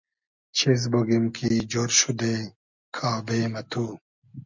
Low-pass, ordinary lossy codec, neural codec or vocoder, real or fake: 7.2 kHz; MP3, 64 kbps; none; real